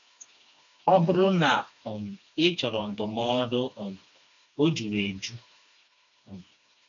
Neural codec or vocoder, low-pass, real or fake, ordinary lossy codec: codec, 16 kHz, 2 kbps, FreqCodec, smaller model; 7.2 kHz; fake; MP3, 64 kbps